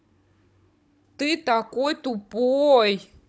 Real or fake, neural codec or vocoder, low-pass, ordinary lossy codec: fake; codec, 16 kHz, 16 kbps, FunCodec, trained on Chinese and English, 50 frames a second; none; none